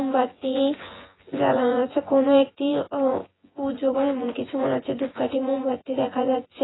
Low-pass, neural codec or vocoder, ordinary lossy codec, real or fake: 7.2 kHz; vocoder, 24 kHz, 100 mel bands, Vocos; AAC, 16 kbps; fake